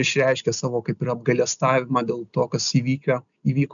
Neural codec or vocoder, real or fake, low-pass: none; real; 7.2 kHz